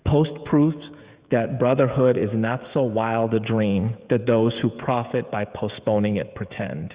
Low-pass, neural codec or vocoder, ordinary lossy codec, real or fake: 3.6 kHz; codec, 16 kHz, 16 kbps, FreqCodec, smaller model; Opus, 64 kbps; fake